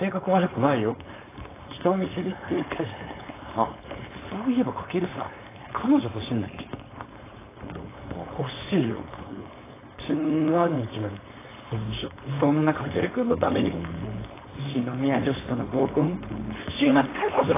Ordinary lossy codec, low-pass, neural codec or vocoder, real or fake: AAC, 16 kbps; 3.6 kHz; codec, 16 kHz, 4.8 kbps, FACodec; fake